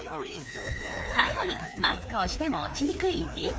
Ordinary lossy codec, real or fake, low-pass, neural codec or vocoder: none; fake; none; codec, 16 kHz, 2 kbps, FreqCodec, larger model